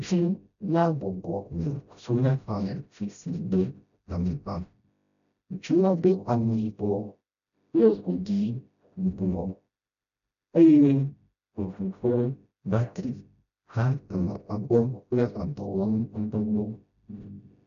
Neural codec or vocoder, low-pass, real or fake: codec, 16 kHz, 0.5 kbps, FreqCodec, smaller model; 7.2 kHz; fake